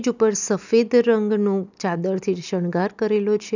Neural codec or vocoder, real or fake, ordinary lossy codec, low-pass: none; real; none; 7.2 kHz